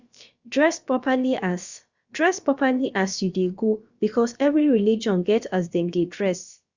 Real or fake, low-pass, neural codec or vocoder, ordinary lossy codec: fake; 7.2 kHz; codec, 16 kHz, about 1 kbps, DyCAST, with the encoder's durations; Opus, 64 kbps